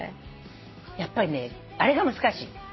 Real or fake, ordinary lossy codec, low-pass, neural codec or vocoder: real; MP3, 24 kbps; 7.2 kHz; none